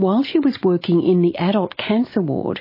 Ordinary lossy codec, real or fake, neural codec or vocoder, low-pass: MP3, 24 kbps; real; none; 5.4 kHz